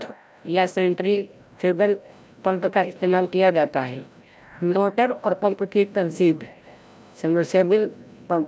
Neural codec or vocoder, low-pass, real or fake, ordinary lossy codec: codec, 16 kHz, 0.5 kbps, FreqCodec, larger model; none; fake; none